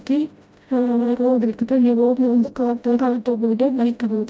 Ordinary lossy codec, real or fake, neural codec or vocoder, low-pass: none; fake; codec, 16 kHz, 0.5 kbps, FreqCodec, smaller model; none